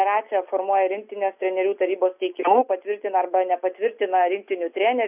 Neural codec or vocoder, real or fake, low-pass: none; real; 3.6 kHz